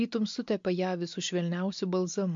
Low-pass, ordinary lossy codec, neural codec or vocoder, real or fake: 7.2 kHz; MP3, 48 kbps; none; real